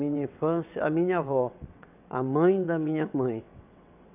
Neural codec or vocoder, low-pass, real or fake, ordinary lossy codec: vocoder, 22.05 kHz, 80 mel bands, WaveNeXt; 3.6 kHz; fake; none